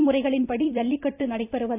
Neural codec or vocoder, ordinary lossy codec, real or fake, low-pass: vocoder, 44.1 kHz, 128 mel bands every 256 samples, BigVGAN v2; AAC, 32 kbps; fake; 3.6 kHz